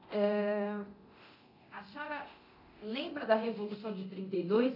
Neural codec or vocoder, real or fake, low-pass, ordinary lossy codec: codec, 24 kHz, 0.9 kbps, DualCodec; fake; 5.4 kHz; AAC, 48 kbps